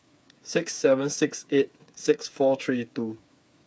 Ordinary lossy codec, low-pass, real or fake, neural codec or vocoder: none; none; fake; codec, 16 kHz, 8 kbps, FreqCodec, smaller model